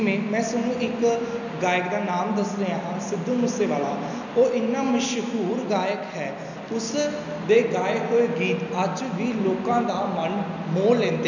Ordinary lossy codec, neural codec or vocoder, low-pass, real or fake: none; none; 7.2 kHz; real